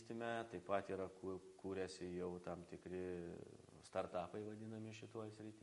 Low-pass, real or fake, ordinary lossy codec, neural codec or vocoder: 14.4 kHz; real; MP3, 48 kbps; none